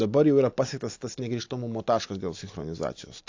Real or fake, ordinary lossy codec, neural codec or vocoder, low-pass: real; AAC, 48 kbps; none; 7.2 kHz